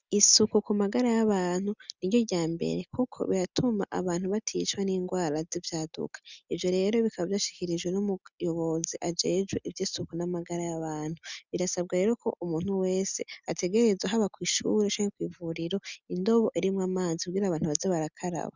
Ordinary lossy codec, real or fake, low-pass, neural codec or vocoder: Opus, 64 kbps; real; 7.2 kHz; none